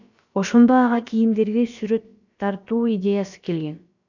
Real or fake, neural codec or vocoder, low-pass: fake; codec, 16 kHz, about 1 kbps, DyCAST, with the encoder's durations; 7.2 kHz